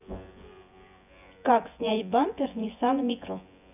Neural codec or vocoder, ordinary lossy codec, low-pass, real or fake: vocoder, 24 kHz, 100 mel bands, Vocos; none; 3.6 kHz; fake